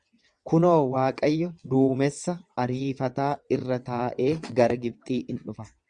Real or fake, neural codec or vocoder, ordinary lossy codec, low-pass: fake; vocoder, 22.05 kHz, 80 mel bands, WaveNeXt; Opus, 64 kbps; 9.9 kHz